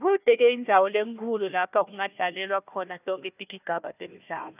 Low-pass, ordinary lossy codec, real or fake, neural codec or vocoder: 3.6 kHz; none; fake; codec, 16 kHz, 1 kbps, FunCodec, trained on Chinese and English, 50 frames a second